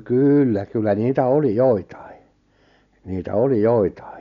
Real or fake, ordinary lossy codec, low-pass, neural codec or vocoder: real; none; 7.2 kHz; none